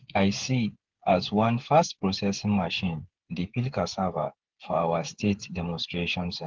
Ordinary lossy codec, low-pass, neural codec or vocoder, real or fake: Opus, 16 kbps; 7.2 kHz; codec, 16 kHz, 8 kbps, FreqCodec, smaller model; fake